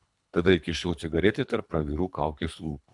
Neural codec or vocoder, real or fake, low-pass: codec, 24 kHz, 3 kbps, HILCodec; fake; 10.8 kHz